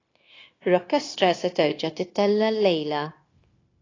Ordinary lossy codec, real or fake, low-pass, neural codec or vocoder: AAC, 32 kbps; fake; 7.2 kHz; codec, 16 kHz, 0.9 kbps, LongCat-Audio-Codec